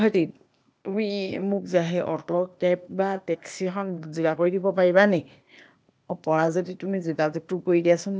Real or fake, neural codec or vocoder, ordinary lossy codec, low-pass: fake; codec, 16 kHz, 0.8 kbps, ZipCodec; none; none